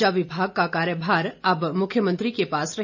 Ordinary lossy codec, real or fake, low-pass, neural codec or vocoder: none; real; 7.2 kHz; none